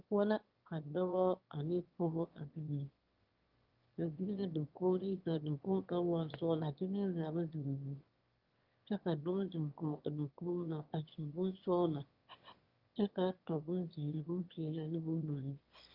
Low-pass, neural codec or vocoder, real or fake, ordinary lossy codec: 5.4 kHz; autoencoder, 22.05 kHz, a latent of 192 numbers a frame, VITS, trained on one speaker; fake; Opus, 32 kbps